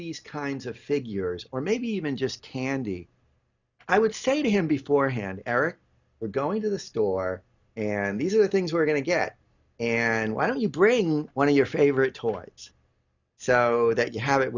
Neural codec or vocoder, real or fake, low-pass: none; real; 7.2 kHz